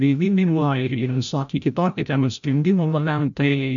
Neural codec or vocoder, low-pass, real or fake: codec, 16 kHz, 0.5 kbps, FreqCodec, larger model; 7.2 kHz; fake